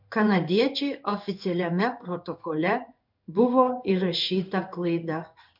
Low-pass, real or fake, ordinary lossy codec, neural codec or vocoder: 5.4 kHz; fake; MP3, 48 kbps; codec, 16 kHz in and 24 kHz out, 1 kbps, XY-Tokenizer